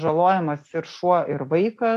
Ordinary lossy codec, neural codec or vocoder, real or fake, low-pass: AAC, 64 kbps; none; real; 14.4 kHz